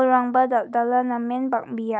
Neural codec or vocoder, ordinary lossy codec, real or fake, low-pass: none; none; real; none